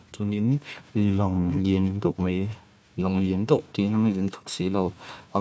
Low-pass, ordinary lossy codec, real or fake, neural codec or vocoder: none; none; fake; codec, 16 kHz, 1 kbps, FunCodec, trained on Chinese and English, 50 frames a second